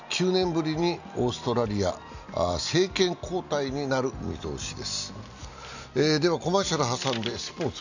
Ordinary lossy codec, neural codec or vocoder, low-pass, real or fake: none; none; 7.2 kHz; real